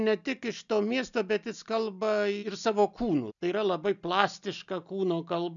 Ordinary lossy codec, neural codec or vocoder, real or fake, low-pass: MP3, 96 kbps; none; real; 7.2 kHz